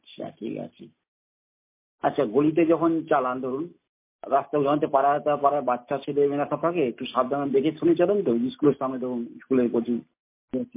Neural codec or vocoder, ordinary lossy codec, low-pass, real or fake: none; MP3, 24 kbps; 3.6 kHz; real